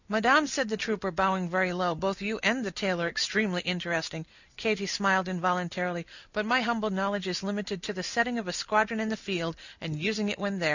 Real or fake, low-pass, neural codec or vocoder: real; 7.2 kHz; none